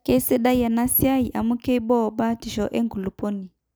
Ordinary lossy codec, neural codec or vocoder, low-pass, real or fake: none; none; none; real